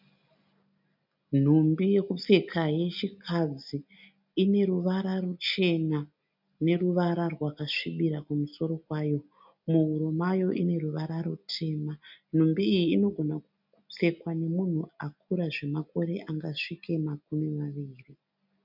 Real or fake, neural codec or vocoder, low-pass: real; none; 5.4 kHz